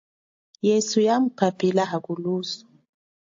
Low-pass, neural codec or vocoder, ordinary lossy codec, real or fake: 7.2 kHz; none; AAC, 48 kbps; real